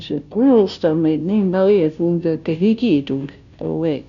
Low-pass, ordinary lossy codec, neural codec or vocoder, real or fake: 7.2 kHz; none; codec, 16 kHz, 0.5 kbps, FunCodec, trained on Chinese and English, 25 frames a second; fake